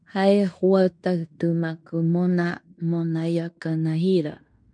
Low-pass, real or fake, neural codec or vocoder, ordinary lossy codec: 9.9 kHz; fake; codec, 16 kHz in and 24 kHz out, 0.9 kbps, LongCat-Audio-Codec, fine tuned four codebook decoder; MP3, 96 kbps